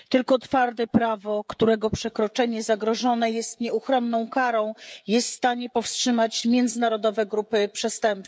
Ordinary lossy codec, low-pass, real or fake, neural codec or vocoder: none; none; fake; codec, 16 kHz, 16 kbps, FreqCodec, smaller model